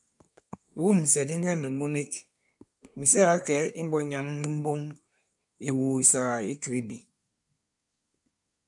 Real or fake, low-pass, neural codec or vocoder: fake; 10.8 kHz; codec, 24 kHz, 1 kbps, SNAC